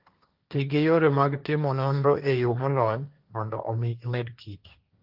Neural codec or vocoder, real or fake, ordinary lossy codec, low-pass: codec, 16 kHz, 1.1 kbps, Voila-Tokenizer; fake; Opus, 24 kbps; 5.4 kHz